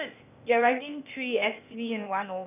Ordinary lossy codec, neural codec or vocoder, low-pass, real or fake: none; codec, 16 kHz, 0.8 kbps, ZipCodec; 3.6 kHz; fake